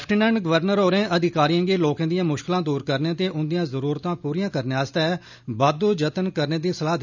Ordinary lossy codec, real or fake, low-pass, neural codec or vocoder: none; real; 7.2 kHz; none